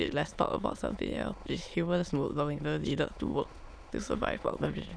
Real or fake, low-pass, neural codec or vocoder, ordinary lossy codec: fake; none; autoencoder, 22.05 kHz, a latent of 192 numbers a frame, VITS, trained on many speakers; none